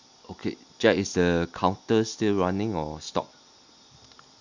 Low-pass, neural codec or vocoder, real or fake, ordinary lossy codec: 7.2 kHz; none; real; none